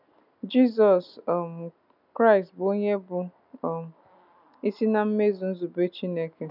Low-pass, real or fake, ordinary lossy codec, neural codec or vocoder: 5.4 kHz; real; none; none